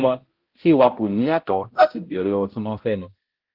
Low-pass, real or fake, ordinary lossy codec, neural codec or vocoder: 5.4 kHz; fake; Opus, 16 kbps; codec, 16 kHz, 0.5 kbps, X-Codec, HuBERT features, trained on balanced general audio